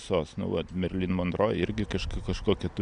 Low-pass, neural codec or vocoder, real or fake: 9.9 kHz; none; real